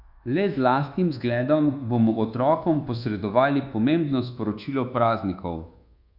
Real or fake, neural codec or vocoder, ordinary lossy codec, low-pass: fake; codec, 24 kHz, 1.2 kbps, DualCodec; Opus, 64 kbps; 5.4 kHz